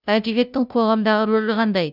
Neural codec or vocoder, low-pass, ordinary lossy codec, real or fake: codec, 16 kHz, 0.5 kbps, FunCodec, trained on Chinese and English, 25 frames a second; 5.4 kHz; none; fake